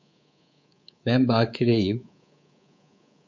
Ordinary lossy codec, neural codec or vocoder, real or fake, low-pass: MP3, 48 kbps; codec, 24 kHz, 3.1 kbps, DualCodec; fake; 7.2 kHz